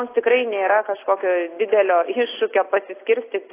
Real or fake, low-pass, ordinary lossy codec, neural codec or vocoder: real; 3.6 kHz; AAC, 24 kbps; none